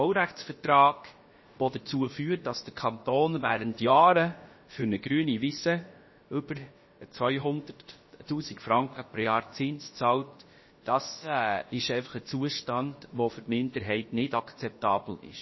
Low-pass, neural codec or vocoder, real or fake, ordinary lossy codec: 7.2 kHz; codec, 16 kHz, about 1 kbps, DyCAST, with the encoder's durations; fake; MP3, 24 kbps